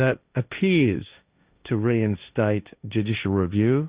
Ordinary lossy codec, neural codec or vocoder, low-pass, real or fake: Opus, 64 kbps; codec, 16 kHz, 1.1 kbps, Voila-Tokenizer; 3.6 kHz; fake